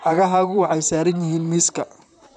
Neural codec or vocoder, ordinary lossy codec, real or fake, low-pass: vocoder, 44.1 kHz, 128 mel bands, Pupu-Vocoder; none; fake; 10.8 kHz